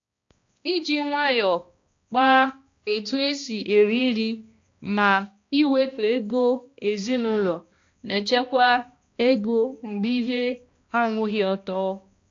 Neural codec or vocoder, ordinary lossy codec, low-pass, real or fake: codec, 16 kHz, 1 kbps, X-Codec, HuBERT features, trained on balanced general audio; AAC, 48 kbps; 7.2 kHz; fake